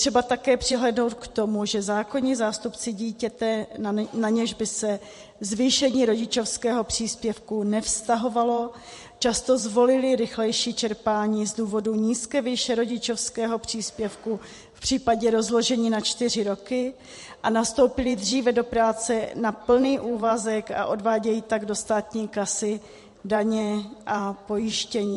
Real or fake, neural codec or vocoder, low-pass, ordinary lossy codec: fake; vocoder, 44.1 kHz, 128 mel bands every 512 samples, BigVGAN v2; 14.4 kHz; MP3, 48 kbps